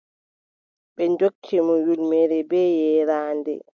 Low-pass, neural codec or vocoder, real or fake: 7.2 kHz; none; real